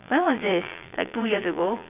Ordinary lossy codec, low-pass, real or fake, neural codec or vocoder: none; 3.6 kHz; fake; vocoder, 22.05 kHz, 80 mel bands, Vocos